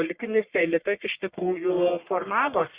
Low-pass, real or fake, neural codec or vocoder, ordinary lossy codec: 3.6 kHz; fake; codec, 44.1 kHz, 1.7 kbps, Pupu-Codec; Opus, 64 kbps